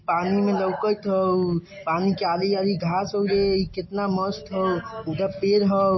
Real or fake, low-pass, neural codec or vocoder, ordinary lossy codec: real; 7.2 kHz; none; MP3, 24 kbps